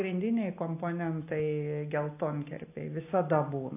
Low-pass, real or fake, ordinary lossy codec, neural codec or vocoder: 3.6 kHz; real; AAC, 24 kbps; none